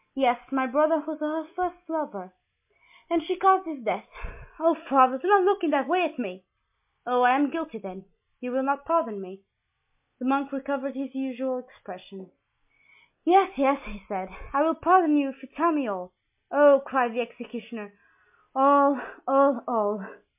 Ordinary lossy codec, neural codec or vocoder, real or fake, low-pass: MP3, 32 kbps; none; real; 3.6 kHz